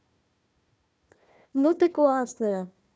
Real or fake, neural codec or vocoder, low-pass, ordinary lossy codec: fake; codec, 16 kHz, 1 kbps, FunCodec, trained on Chinese and English, 50 frames a second; none; none